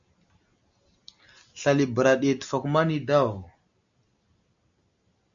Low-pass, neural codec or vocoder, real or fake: 7.2 kHz; none; real